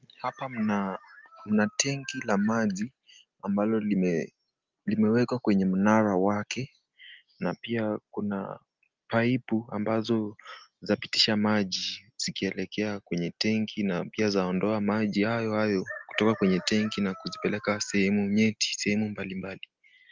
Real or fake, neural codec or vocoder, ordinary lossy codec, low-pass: real; none; Opus, 32 kbps; 7.2 kHz